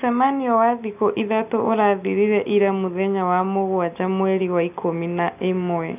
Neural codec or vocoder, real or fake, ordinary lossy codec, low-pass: none; real; none; 3.6 kHz